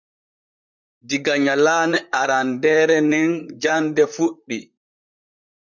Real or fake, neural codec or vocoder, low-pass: fake; vocoder, 44.1 kHz, 128 mel bands, Pupu-Vocoder; 7.2 kHz